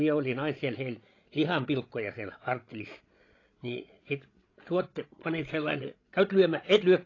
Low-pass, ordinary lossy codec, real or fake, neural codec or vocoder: 7.2 kHz; AAC, 32 kbps; fake; codec, 16 kHz, 16 kbps, FreqCodec, larger model